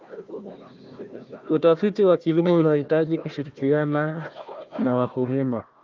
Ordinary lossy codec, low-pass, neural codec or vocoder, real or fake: Opus, 24 kbps; 7.2 kHz; codec, 16 kHz, 1 kbps, FunCodec, trained on Chinese and English, 50 frames a second; fake